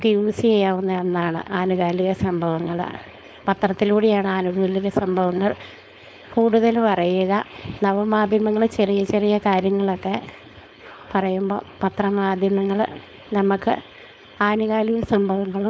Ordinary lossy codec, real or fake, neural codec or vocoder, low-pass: none; fake; codec, 16 kHz, 4.8 kbps, FACodec; none